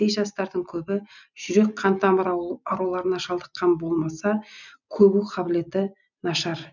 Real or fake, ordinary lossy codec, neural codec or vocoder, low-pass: real; none; none; 7.2 kHz